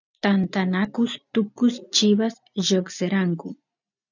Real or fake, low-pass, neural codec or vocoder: real; 7.2 kHz; none